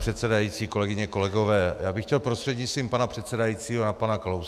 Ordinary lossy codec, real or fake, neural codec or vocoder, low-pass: AAC, 96 kbps; fake; autoencoder, 48 kHz, 128 numbers a frame, DAC-VAE, trained on Japanese speech; 14.4 kHz